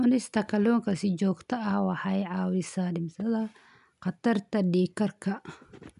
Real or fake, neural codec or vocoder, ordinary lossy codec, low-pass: real; none; none; 10.8 kHz